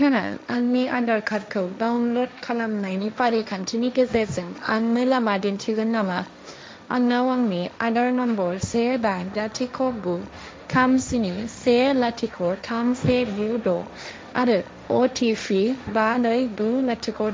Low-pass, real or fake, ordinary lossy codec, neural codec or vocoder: none; fake; none; codec, 16 kHz, 1.1 kbps, Voila-Tokenizer